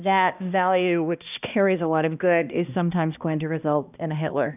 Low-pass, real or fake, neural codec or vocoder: 3.6 kHz; fake; codec, 16 kHz, 1 kbps, X-Codec, HuBERT features, trained on balanced general audio